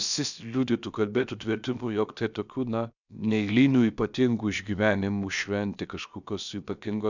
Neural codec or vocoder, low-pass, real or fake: codec, 16 kHz, about 1 kbps, DyCAST, with the encoder's durations; 7.2 kHz; fake